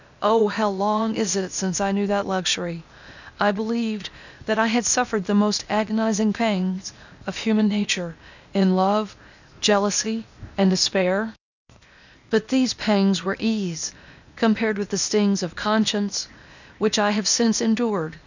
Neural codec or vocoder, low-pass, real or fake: codec, 16 kHz, 0.8 kbps, ZipCodec; 7.2 kHz; fake